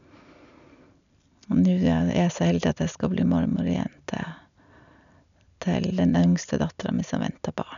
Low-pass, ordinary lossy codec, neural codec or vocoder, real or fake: 7.2 kHz; none; none; real